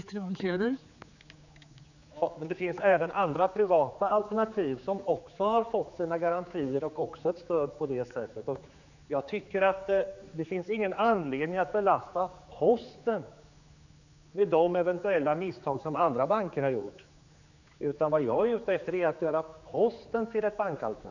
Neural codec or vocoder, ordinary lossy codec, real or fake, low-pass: codec, 16 kHz, 4 kbps, X-Codec, HuBERT features, trained on general audio; none; fake; 7.2 kHz